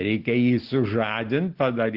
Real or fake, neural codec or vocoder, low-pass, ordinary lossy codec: real; none; 5.4 kHz; Opus, 16 kbps